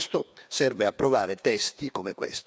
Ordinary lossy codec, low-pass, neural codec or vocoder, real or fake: none; none; codec, 16 kHz, 2 kbps, FreqCodec, larger model; fake